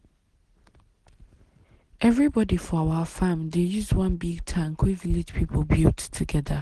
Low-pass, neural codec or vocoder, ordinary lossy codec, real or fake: 10.8 kHz; none; Opus, 16 kbps; real